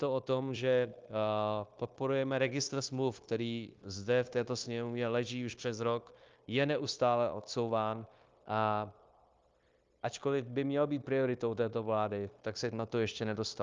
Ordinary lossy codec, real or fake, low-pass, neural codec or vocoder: Opus, 32 kbps; fake; 7.2 kHz; codec, 16 kHz, 0.9 kbps, LongCat-Audio-Codec